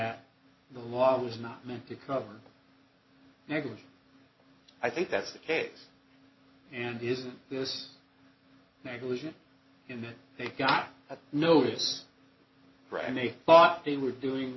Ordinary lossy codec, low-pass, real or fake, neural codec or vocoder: MP3, 24 kbps; 7.2 kHz; real; none